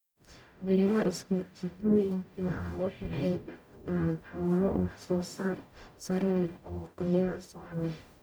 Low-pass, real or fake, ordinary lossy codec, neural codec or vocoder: none; fake; none; codec, 44.1 kHz, 0.9 kbps, DAC